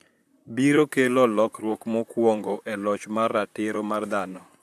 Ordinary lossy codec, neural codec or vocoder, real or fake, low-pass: AAC, 96 kbps; vocoder, 44.1 kHz, 128 mel bands, Pupu-Vocoder; fake; 14.4 kHz